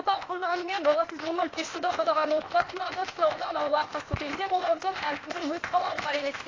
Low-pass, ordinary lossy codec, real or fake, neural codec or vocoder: 7.2 kHz; AAC, 32 kbps; fake; codec, 16 kHz, 0.8 kbps, ZipCodec